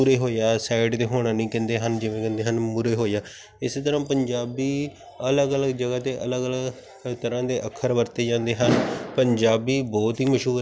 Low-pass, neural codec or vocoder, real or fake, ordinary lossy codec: none; none; real; none